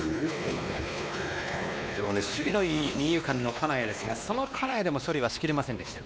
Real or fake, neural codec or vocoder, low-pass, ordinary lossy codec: fake; codec, 16 kHz, 2 kbps, X-Codec, WavLM features, trained on Multilingual LibriSpeech; none; none